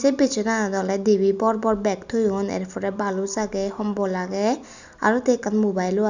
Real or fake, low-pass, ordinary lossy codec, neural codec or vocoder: real; 7.2 kHz; none; none